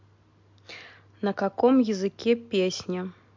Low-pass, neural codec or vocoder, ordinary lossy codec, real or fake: 7.2 kHz; none; MP3, 48 kbps; real